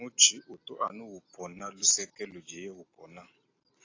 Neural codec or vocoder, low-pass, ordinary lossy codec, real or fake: none; 7.2 kHz; AAC, 32 kbps; real